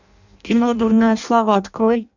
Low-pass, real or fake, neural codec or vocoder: 7.2 kHz; fake; codec, 16 kHz in and 24 kHz out, 0.6 kbps, FireRedTTS-2 codec